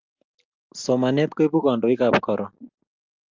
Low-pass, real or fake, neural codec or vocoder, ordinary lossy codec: 7.2 kHz; real; none; Opus, 32 kbps